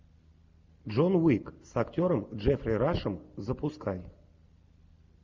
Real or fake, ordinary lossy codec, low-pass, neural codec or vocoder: real; MP3, 64 kbps; 7.2 kHz; none